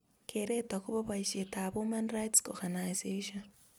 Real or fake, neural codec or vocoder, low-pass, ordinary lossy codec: real; none; none; none